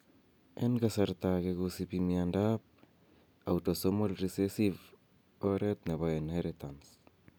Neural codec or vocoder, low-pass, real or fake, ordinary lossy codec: none; none; real; none